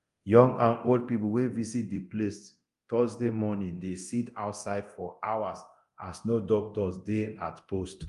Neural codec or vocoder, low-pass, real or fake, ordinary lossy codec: codec, 24 kHz, 0.9 kbps, DualCodec; 10.8 kHz; fake; Opus, 32 kbps